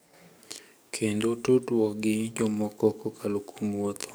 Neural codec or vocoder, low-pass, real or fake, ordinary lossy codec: codec, 44.1 kHz, 7.8 kbps, DAC; none; fake; none